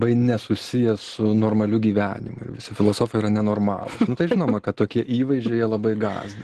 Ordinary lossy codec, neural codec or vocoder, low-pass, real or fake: Opus, 16 kbps; none; 10.8 kHz; real